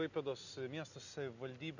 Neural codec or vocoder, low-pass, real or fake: none; 7.2 kHz; real